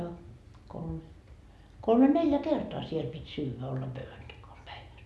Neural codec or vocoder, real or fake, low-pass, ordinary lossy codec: none; real; none; none